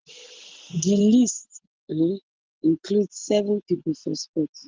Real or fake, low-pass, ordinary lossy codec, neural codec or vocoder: real; 7.2 kHz; Opus, 16 kbps; none